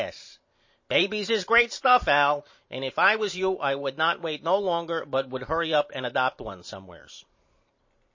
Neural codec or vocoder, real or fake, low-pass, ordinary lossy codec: vocoder, 44.1 kHz, 128 mel bands every 512 samples, BigVGAN v2; fake; 7.2 kHz; MP3, 32 kbps